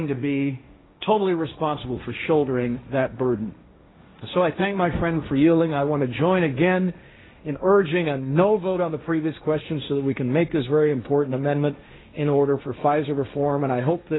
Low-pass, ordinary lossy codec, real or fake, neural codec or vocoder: 7.2 kHz; AAC, 16 kbps; fake; codec, 16 kHz, 1.1 kbps, Voila-Tokenizer